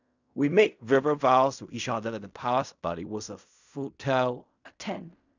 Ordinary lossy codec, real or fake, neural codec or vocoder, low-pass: none; fake; codec, 16 kHz in and 24 kHz out, 0.4 kbps, LongCat-Audio-Codec, fine tuned four codebook decoder; 7.2 kHz